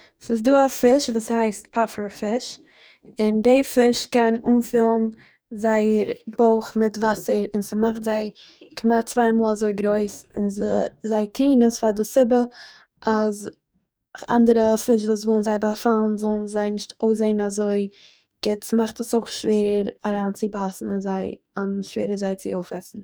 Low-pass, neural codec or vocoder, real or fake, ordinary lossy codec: none; codec, 44.1 kHz, 2.6 kbps, DAC; fake; none